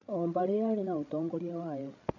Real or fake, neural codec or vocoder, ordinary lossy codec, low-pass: fake; codec, 16 kHz, 8 kbps, FreqCodec, larger model; none; 7.2 kHz